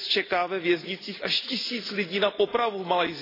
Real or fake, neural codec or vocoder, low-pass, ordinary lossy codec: real; none; 5.4 kHz; AAC, 24 kbps